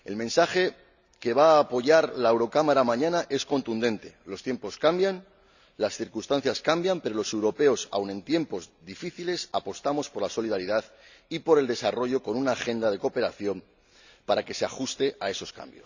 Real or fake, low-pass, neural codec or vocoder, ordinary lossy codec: real; 7.2 kHz; none; none